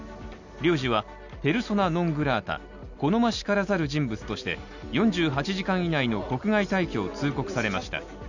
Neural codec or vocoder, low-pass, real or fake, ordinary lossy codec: none; 7.2 kHz; real; none